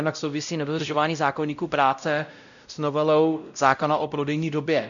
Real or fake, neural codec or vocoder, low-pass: fake; codec, 16 kHz, 0.5 kbps, X-Codec, WavLM features, trained on Multilingual LibriSpeech; 7.2 kHz